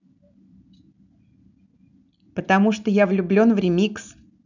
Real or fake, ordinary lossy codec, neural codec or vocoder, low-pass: real; none; none; 7.2 kHz